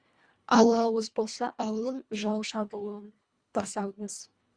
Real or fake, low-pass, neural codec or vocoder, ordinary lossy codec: fake; 9.9 kHz; codec, 24 kHz, 1.5 kbps, HILCodec; Opus, 64 kbps